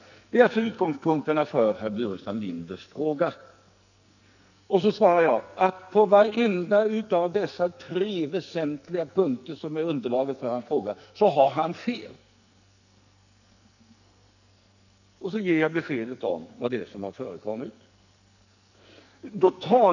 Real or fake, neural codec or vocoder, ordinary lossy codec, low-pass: fake; codec, 44.1 kHz, 2.6 kbps, SNAC; none; 7.2 kHz